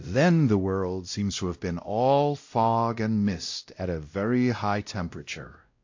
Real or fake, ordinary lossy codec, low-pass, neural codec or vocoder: fake; AAC, 48 kbps; 7.2 kHz; codec, 16 kHz, 0.5 kbps, X-Codec, WavLM features, trained on Multilingual LibriSpeech